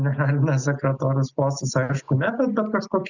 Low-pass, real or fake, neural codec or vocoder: 7.2 kHz; real; none